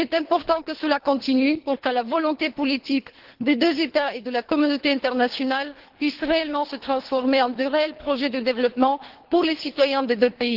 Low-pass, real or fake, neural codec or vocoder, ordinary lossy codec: 5.4 kHz; fake; codec, 24 kHz, 3 kbps, HILCodec; Opus, 16 kbps